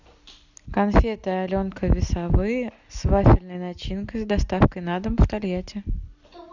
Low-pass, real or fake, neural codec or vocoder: 7.2 kHz; real; none